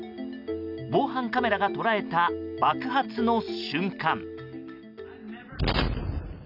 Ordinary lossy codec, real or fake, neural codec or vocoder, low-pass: none; real; none; 5.4 kHz